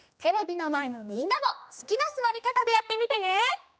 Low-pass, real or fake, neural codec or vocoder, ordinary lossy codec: none; fake; codec, 16 kHz, 1 kbps, X-Codec, HuBERT features, trained on general audio; none